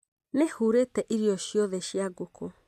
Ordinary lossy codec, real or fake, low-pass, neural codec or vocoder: none; real; 14.4 kHz; none